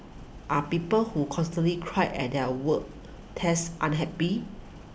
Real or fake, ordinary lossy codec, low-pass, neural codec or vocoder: real; none; none; none